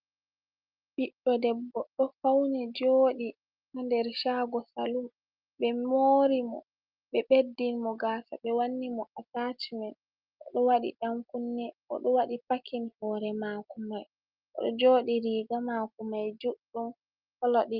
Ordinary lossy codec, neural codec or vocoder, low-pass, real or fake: Opus, 24 kbps; none; 5.4 kHz; real